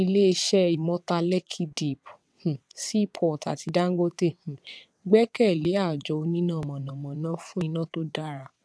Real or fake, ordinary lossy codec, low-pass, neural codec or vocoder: fake; none; none; vocoder, 22.05 kHz, 80 mel bands, WaveNeXt